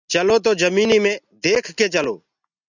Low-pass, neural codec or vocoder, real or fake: 7.2 kHz; none; real